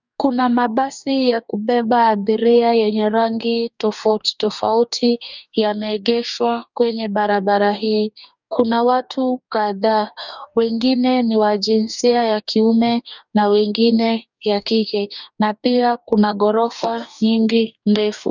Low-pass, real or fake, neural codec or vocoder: 7.2 kHz; fake; codec, 44.1 kHz, 2.6 kbps, DAC